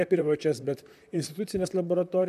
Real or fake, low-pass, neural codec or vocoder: fake; 14.4 kHz; vocoder, 44.1 kHz, 128 mel bands, Pupu-Vocoder